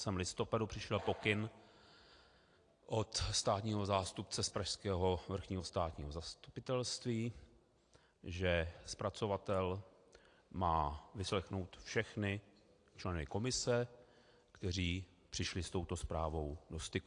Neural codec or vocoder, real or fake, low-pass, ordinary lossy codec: none; real; 9.9 kHz; AAC, 48 kbps